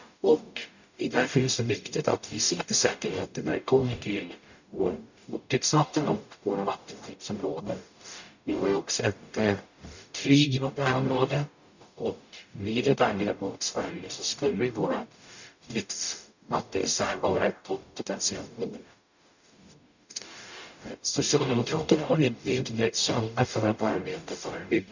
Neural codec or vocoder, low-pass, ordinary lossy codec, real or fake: codec, 44.1 kHz, 0.9 kbps, DAC; 7.2 kHz; none; fake